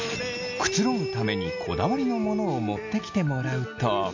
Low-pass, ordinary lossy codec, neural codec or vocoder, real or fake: 7.2 kHz; AAC, 48 kbps; none; real